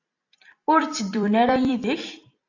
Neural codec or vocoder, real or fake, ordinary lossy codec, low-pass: vocoder, 44.1 kHz, 128 mel bands every 256 samples, BigVGAN v2; fake; AAC, 48 kbps; 7.2 kHz